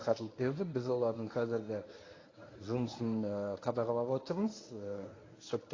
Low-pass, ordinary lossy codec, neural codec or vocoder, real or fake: 7.2 kHz; AAC, 32 kbps; codec, 24 kHz, 0.9 kbps, WavTokenizer, medium speech release version 1; fake